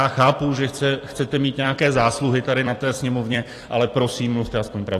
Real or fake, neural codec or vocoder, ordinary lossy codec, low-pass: fake; vocoder, 44.1 kHz, 128 mel bands every 256 samples, BigVGAN v2; AAC, 48 kbps; 14.4 kHz